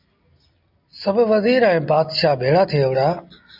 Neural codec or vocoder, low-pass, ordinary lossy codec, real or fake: none; 5.4 kHz; MP3, 48 kbps; real